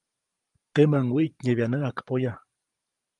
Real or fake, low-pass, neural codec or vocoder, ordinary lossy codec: fake; 10.8 kHz; vocoder, 44.1 kHz, 128 mel bands every 512 samples, BigVGAN v2; Opus, 32 kbps